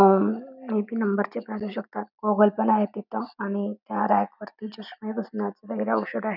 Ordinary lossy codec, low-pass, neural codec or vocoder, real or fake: none; 5.4 kHz; none; real